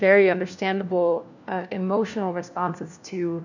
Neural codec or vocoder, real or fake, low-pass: codec, 16 kHz, 1 kbps, FunCodec, trained on LibriTTS, 50 frames a second; fake; 7.2 kHz